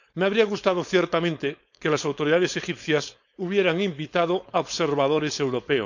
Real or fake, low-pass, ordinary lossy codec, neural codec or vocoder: fake; 7.2 kHz; none; codec, 16 kHz, 4.8 kbps, FACodec